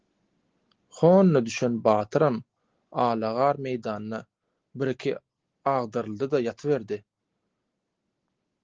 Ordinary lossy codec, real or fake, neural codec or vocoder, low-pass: Opus, 16 kbps; real; none; 7.2 kHz